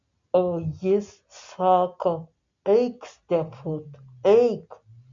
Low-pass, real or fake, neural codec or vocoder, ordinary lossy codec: 7.2 kHz; real; none; MP3, 64 kbps